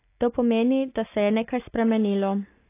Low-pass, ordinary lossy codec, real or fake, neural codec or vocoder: 3.6 kHz; AAC, 24 kbps; real; none